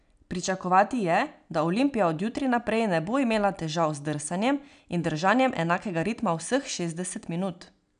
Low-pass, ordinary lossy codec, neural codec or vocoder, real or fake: 9.9 kHz; none; none; real